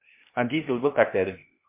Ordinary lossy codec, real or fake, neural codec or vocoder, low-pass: MP3, 24 kbps; fake; codec, 16 kHz, 0.8 kbps, ZipCodec; 3.6 kHz